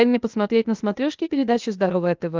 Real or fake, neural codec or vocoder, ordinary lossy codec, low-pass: fake; codec, 16 kHz, 0.8 kbps, ZipCodec; Opus, 24 kbps; 7.2 kHz